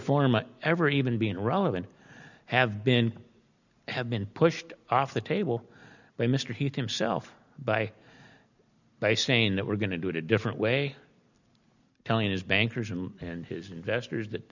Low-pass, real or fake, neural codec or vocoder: 7.2 kHz; real; none